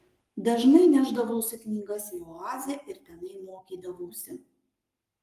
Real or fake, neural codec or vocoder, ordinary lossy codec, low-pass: fake; codec, 44.1 kHz, 7.8 kbps, Pupu-Codec; Opus, 32 kbps; 14.4 kHz